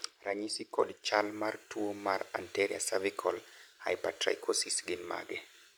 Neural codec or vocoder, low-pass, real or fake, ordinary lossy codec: none; none; real; none